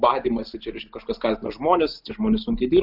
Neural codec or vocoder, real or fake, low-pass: none; real; 5.4 kHz